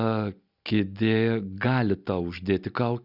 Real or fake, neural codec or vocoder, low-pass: real; none; 5.4 kHz